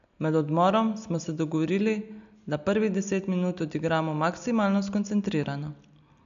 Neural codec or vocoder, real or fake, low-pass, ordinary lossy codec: none; real; 7.2 kHz; AAC, 96 kbps